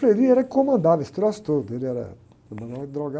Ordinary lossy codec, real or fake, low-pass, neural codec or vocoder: none; real; none; none